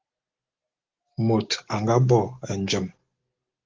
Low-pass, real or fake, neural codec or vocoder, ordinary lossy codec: 7.2 kHz; real; none; Opus, 16 kbps